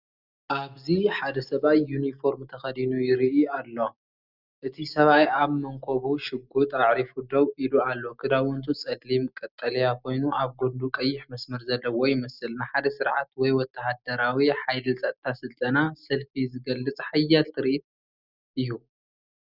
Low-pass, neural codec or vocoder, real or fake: 5.4 kHz; none; real